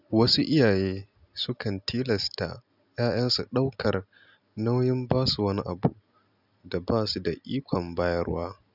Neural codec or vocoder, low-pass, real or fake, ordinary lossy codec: none; 5.4 kHz; real; none